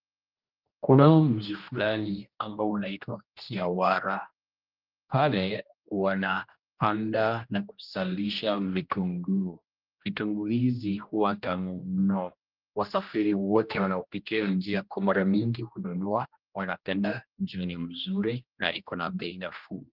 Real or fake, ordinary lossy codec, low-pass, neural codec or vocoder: fake; Opus, 24 kbps; 5.4 kHz; codec, 16 kHz, 1 kbps, X-Codec, HuBERT features, trained on general audio